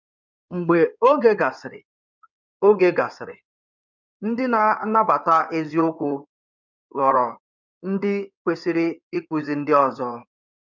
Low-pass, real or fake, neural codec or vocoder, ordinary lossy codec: 7.2 kHz; fake; codec, 16 kHz in and 24 kHz out, 2.2 kbps, FireRedTTS-2 codec; none